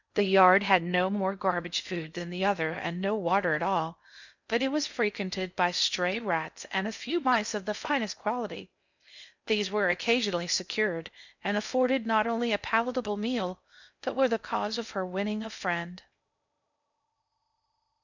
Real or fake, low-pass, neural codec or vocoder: fake; 7.2 kHz; codec, 16 kHz in and 24 kHz out, 0.6 kbps, FocalCodec, streaming, 4096 codes